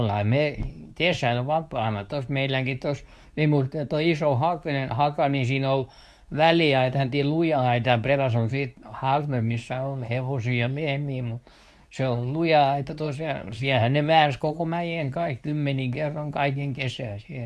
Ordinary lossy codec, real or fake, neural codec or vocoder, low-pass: none; fake; codec, 24 kHz, 0.9 kbps, WavTokenizer, medium speech release version 2; none